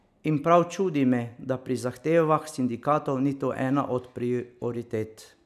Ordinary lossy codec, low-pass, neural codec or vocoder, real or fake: none; 14.4 kHz; none; real